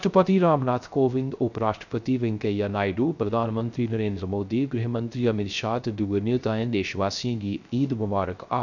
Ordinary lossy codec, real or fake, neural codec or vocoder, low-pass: none; fake; codec, 16 kHz, 0.3 kbps, FocalCodec; 7.2 kHz